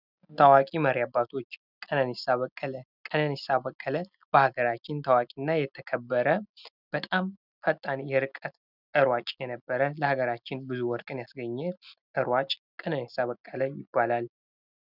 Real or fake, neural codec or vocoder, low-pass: real; none; 5.4 kHz